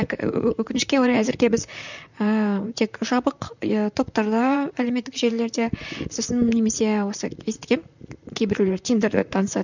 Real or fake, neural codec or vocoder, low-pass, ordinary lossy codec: fake; vocoder, 44.1 kHz, 128 mel bands, Pupu-Vocoder; 7.2 kHz; none